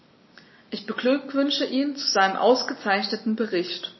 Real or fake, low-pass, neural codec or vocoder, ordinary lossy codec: real; 7.2 kHz; none; MP3, 24 kbps